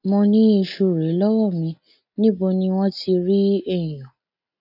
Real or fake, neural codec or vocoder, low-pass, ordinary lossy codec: real; none; 5.4 kHz; none